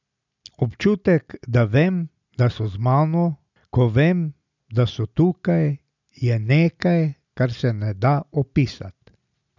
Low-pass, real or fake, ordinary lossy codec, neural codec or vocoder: 7.2 kHz; real; none; none